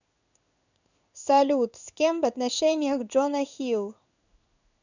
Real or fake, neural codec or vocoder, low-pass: fake; codec, 16 kHz in and 24 kHz out, 1 kbps, XY-Tokenizer; 7.2 kHz